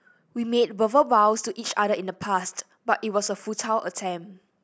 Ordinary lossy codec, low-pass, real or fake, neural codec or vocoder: none; none; real; none